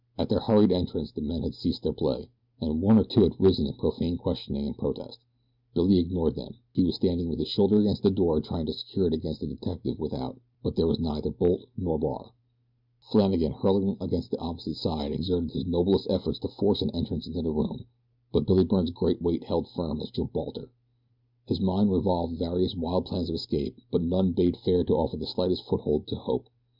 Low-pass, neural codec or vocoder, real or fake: 5.4 kHz; none; real